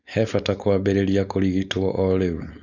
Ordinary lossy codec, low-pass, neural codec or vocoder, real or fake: Opus, 64 kbps; 7.2 kHz; codec, 16 kHz, 4.8 kbps, FACodec; fake